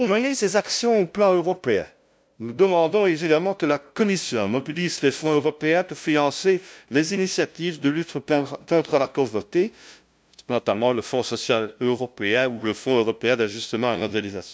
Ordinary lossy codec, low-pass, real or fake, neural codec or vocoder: none; none; fake; codec, 16 kHz, 0.5 kbps, FunCodec, trained on LibriTTS, 25 frames a second